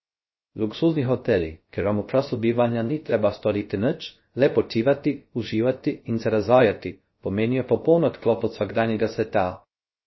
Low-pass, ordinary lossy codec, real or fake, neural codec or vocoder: 7.2 kHz; MP3, 24 kbps; fake; codec, 16 kHz, 0.3 kbps, FocalCodec